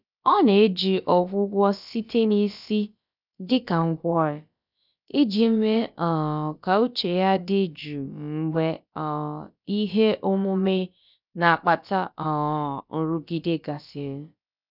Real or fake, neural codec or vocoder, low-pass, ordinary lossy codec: fake; codec, 16 kHz, about 1 kbps, DyCAST, with the encoder's durations; 5.4 kHz; none